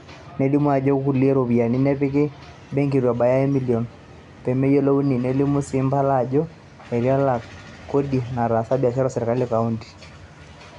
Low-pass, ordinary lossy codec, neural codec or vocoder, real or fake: 10.8 kHz; none; none; real